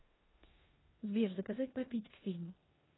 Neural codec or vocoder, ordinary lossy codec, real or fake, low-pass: codec, 16 kHz in and 24 kHz out, 0.9 kbps, LongCat-Audio-Codec, four codebook decoder; AAC, 16 kbps; fake; 7.2 kHz